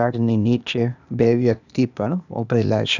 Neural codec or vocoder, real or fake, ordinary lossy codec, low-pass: codec, 16 kHz, 0.8 kbps, ZipCodec; fake; none; 7.2 kHz